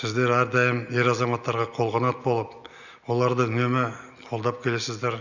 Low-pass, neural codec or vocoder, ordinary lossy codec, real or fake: 7.2 kHz; none; none; real